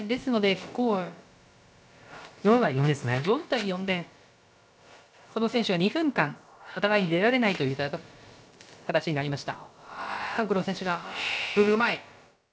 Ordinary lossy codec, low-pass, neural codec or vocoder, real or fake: none; none; codec, 16 kHz, about 1 kbps, DyCAST, with the encoder's durations; fake